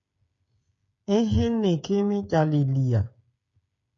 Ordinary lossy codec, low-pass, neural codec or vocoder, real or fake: MP3, 48 kbps; 7.2 kHz; codec, 16 kHz, 8 kbps, FreqCodec, smaller model; fake